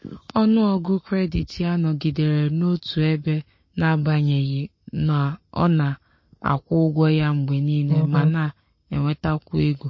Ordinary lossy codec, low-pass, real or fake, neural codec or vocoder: MP3, 32 kbps; 7.2 kHz; fake; codec, 44.1 kHz, 7.8 kbps, Pupu-Codec